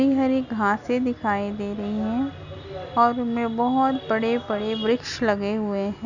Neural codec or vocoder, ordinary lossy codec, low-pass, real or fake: none; none; 7.2 kHz; real